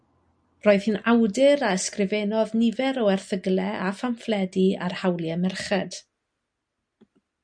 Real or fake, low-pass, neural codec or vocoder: real; 9.9 kHz; none